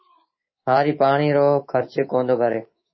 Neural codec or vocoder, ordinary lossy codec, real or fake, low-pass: codec, 24 kHz, 3.1 kbps, DualCodec; MP3, 24 kbps; fake; 7.2 kHz